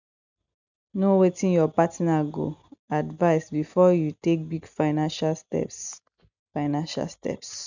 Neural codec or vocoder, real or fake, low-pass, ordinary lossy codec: none; real; 7.2 kHz; none